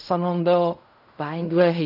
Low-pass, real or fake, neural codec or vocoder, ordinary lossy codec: 5.4 kHz; fake; codec, 16 kHz in and 24 kHz out, 0.4 kbps, LongCat-Audio-Codec, fine tuned four codebook decoder; none